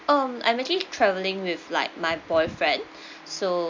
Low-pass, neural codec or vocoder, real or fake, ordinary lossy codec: 7.2 kHz; none; real; MP3, 64 kbps